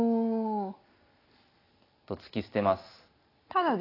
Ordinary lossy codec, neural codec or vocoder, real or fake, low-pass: AAC, 24 kbps; none; real; 5.4 kHz